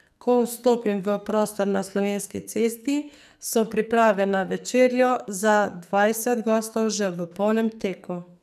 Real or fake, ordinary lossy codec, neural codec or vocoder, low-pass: fake; none; codec, 44.1 kHz, 2.6 kbps, SNAC; 14.4 kHz